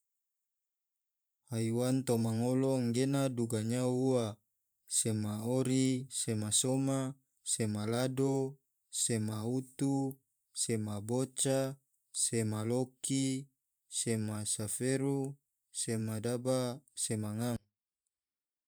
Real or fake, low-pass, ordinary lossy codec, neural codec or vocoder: fake; none; none; vocoder, 44.1 kHz, 128 mel bands every 512 samples, BigVGAN v2